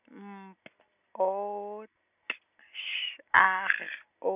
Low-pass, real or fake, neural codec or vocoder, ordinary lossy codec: 3.6 kHz; real; none; none